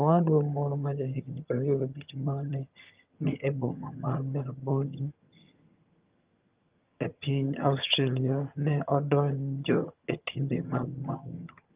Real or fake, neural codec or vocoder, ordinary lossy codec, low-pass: fake; vocoder, 22.05 kHz, 80 mel bands, HiFi-GAN; Opus, 24 kbps; 3.6 kHz